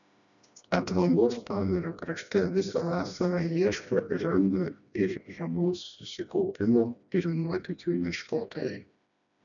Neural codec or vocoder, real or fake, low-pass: codec, 16 kHz, 1 kbps, FreqCodec, smaller model; fake; 7.2 kHz